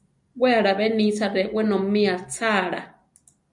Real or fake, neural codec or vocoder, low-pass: real; none; 10.8 kHz